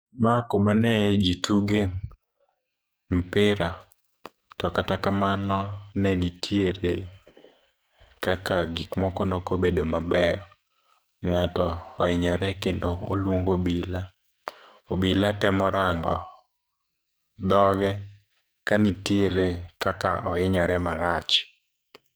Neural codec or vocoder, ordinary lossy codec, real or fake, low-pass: codec, 44.1 kHz, 2.6 kbps, SNAC; none; fake; none